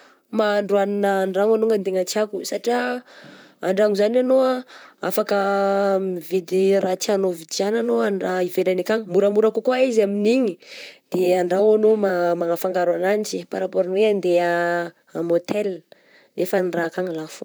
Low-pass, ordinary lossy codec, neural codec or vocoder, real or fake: none; none; vocoder, 44.1 kHz, 128 mel bands, Pupu-Vocoder; fake